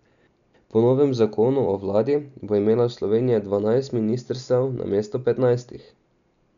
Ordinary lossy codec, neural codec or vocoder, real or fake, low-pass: none; none; real; 7.2 kHz